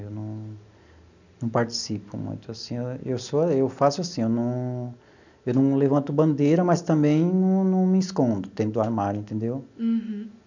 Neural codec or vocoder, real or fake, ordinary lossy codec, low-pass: none; real; none; 7.2 kHz